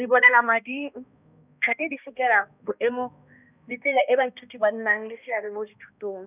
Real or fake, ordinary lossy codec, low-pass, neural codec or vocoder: fake; none; 3.6 kHz; codec, 16 kHz, 1 kbps, X-Codec, HuBERT features, trained on balanced general audio